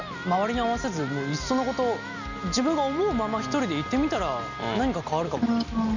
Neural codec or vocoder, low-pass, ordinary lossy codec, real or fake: none; 7.2 kHz; none; real